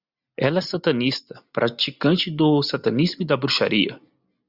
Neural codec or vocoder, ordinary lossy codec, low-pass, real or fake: none; Opus, 64 kbps; 5.4 kHz; real